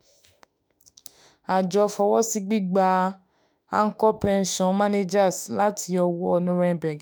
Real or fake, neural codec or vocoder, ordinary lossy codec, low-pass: fake; autoencoder, 48 kHz, 32 numbers a frame, DAC-VAE, trained on Japanese speech; none; none